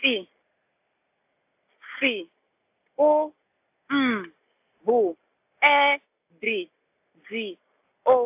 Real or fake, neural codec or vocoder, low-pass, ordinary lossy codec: real; none; 3.6 kHz; AAC, 32 kbps